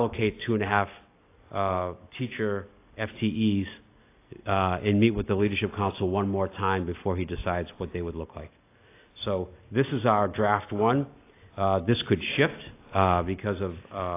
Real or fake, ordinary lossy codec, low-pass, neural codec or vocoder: real; AAC, 24 kbps; 3.6 kHz; none